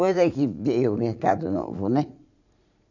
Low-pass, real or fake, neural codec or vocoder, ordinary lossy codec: 7.2 kHz; fake; vocoder, 44.1 kHz, 80 mel bands, Vocos; none